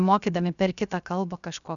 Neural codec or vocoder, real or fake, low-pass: codec, 16 kHz, 0.7 kbps, FocalCodec; fake; 7.2 kHz